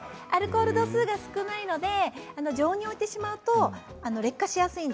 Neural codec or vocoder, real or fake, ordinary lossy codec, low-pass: none; real; none; none